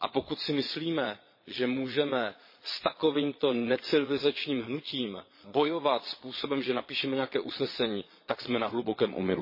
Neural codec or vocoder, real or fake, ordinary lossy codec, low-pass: vocoder, 22.05 kHz, 80 mel bands, Vocos; fake; MP3, 24 kbps; 5.4 kHz